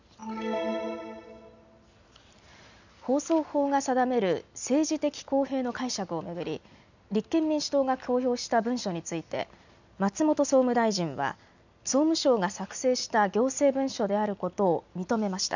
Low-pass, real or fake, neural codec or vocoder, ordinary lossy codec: 7.2 kHz; real; none; none